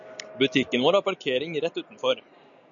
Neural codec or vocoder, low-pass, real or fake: none; 7.2 kHz; real